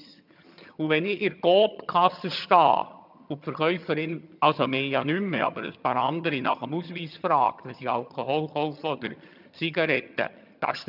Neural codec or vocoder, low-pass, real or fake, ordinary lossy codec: vocoder, 22.05 kHz, 80 mel bands, HiFi-GAN; 5.4 kHz; fake; none